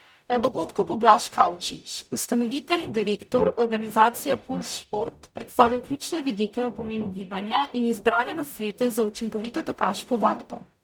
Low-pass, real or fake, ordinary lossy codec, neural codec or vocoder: none; fake; none; codec, 44.1 kHz, 0.9 kbps, DAC